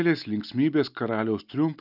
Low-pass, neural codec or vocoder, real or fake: 5.4 kHz; none; real